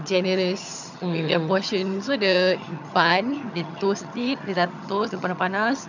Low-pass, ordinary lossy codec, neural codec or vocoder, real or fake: 7.2 kHz; none; vocoder, 22.05 kHz, 80 mel bands, HiFi-GAN; fake